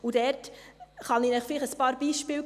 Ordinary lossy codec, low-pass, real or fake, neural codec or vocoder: none; 14.4 kHz; real; none